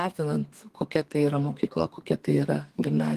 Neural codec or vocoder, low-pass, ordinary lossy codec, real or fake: codec, 32 kHz, 1.9 kbps, SNAC; 14.4 kHz; Opus, 24 kbps; fake